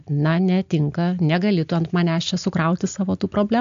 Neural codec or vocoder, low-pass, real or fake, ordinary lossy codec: none; 7.2 kHz; real; AAC, 64 kbps